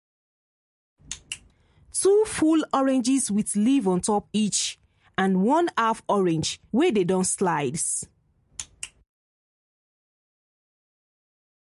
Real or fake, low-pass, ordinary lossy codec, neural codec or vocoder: real; 14.4 kHz; MP3, 48 kbps; none